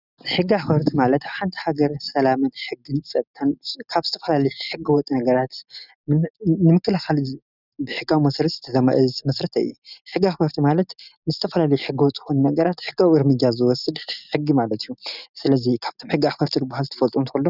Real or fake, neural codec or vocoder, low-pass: real; none; 5.4 kHz